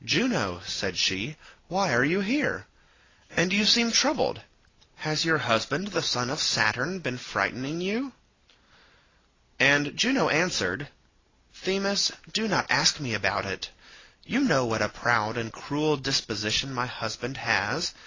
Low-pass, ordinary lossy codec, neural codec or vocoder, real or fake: 7.2 kHz; AAC, 32 kbps; none; real